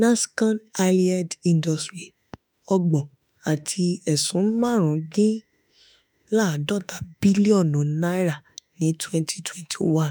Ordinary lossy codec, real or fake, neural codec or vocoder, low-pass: none; fake; autoencoder, 48 kHz, 32 numbers a frame, DAC-VAE, trained on Japanese speech; none